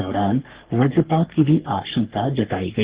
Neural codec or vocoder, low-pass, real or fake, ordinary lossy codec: codec, 44.1 kHz, 3.4 kbps, Pupu-Codec; 3.6 kHz; fake; Opus, 16 kbps